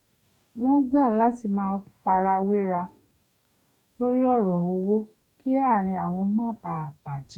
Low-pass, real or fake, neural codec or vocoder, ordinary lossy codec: 19.8 kHz; fake; codec, 44.1 kHz, 2.6 kbps, DAC; none